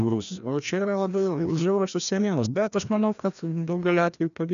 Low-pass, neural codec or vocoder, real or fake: 7.2 kHz; codec, 16 kHz, 1 kbps, FreqCodec, larger model; fake